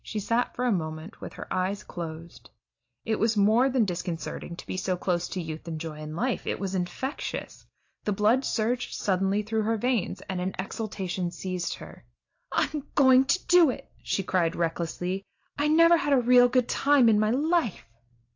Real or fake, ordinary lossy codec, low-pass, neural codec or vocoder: real; AAC, 48 kbps; 7.2 kHz; none